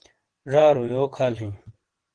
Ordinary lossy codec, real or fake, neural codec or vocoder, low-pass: Opus, 16 kbps; fake; vocoder, 22.05 kHz, 80 mel bands, WaveNeXt; 9.9 kHz